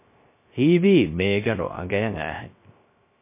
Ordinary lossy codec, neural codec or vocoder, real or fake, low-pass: MP3, 24 kbps; codec, 16 kHz, 0.3 kbps, FocalCodec; fake; 3.6 kHz